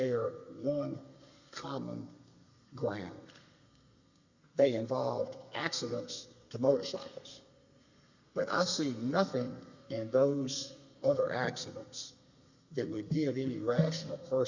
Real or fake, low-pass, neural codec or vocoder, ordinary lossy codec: fake; 7.2 kHz; codec, 32 kHz, 1.9 kbps, SNAC; Opus, 64 kbps